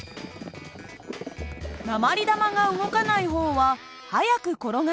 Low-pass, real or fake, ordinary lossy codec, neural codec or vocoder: none; real; none; none